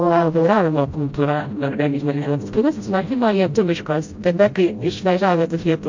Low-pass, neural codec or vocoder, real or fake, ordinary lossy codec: 7.2 kHz; codec, 16 kHz, 0.5 kbps, FreqCodec, smaller model; fake; MP3, 48 kbps